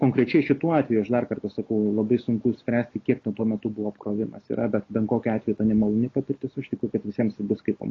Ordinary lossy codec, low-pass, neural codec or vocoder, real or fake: AAC, 32 kbps; 7.2 kHz; none; real